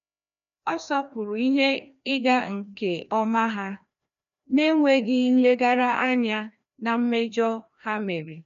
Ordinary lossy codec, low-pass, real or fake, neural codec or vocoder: none; 7.2 kHz; fake; codec, 16 kHz, 1 kbps, FreqCodec, larger model